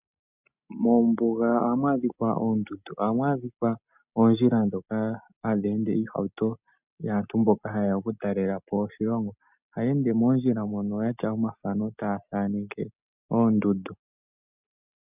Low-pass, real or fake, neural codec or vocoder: 3.6 kHz; real; none